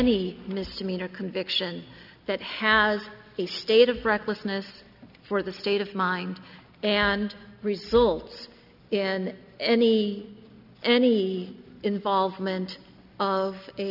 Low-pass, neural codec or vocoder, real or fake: 5.4 kHz; none; real